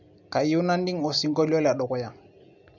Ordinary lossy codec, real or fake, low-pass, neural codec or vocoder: none; real; 7.2 kHz; none